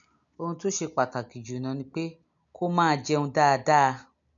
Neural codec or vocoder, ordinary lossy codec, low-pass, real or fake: none; none; 7.2 kHz; real